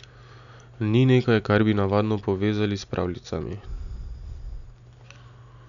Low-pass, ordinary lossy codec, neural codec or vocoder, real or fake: 7.2 kHz; none; none; real